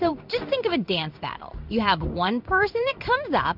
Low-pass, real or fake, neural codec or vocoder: 5.4 kHz; fake; codec, 16 kHz in and 24 kHz out, 1 kbps, XY-Tokenizer